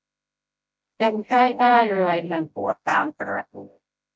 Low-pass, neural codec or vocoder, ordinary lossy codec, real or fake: none; codec, 16 kHz, 0.5 kbps, FreqCodec, smaller model; none; fake